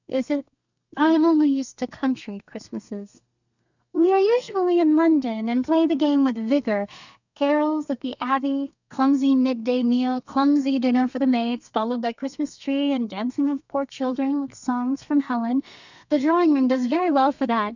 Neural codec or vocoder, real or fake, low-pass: codec, 32 kHz, 1.9 kbps, SNAC; fake; 7.2 kHz